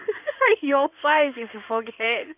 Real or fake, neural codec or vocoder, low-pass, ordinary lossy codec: fake; codec, 16 kHz in and 24 kHz out, 2.2 kbps, FireRedTTS-2 codec; 3.6 kHz; none